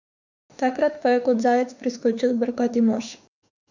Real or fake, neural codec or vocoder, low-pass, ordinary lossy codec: fake; autoencoder, 48 kHz, 32 numbers a frame, DAC-VAE, trained on Japanese speech; 7.2 kHz; none